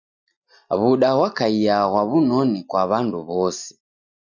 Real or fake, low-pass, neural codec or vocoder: real; 7.2 kHz; none